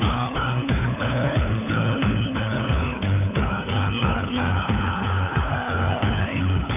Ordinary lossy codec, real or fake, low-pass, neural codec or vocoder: none; fake; 3.6 kHz; codec, 16 kHz, 4 kbps, FunCodec, trained on LibriTTS, 50 frames a second